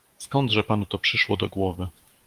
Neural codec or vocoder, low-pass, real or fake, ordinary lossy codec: autoencoder, 48 kHz, 128 numbers a frame, DAC-VAE, trained on Japanese speech; 14.4 kHz; fake; Opus, 24 kbps